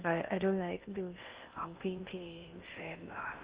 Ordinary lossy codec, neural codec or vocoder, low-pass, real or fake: Opus, 32 kbps; codec, 16 kHz in and 24 kHz out, 0.6 kbps, FocalCodec, streaming, 4096 codes; 3.6 kHz; fake